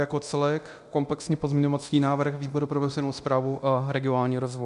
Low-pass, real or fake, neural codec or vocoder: 10.8 kHz; fake; codec, 24 kHz, 0.9 kbps, DualCodec